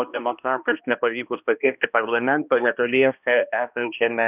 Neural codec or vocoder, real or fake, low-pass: codec, 16 kHz, 1 kbps, X-Codec, HuBERT features, trained on balanced general audio; fake; 3.6 kHz